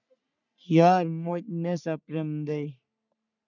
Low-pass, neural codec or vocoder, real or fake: 7.2 kHz; codec, 44.1 kHz, 3.4 kbps, Pupu-Codec; fake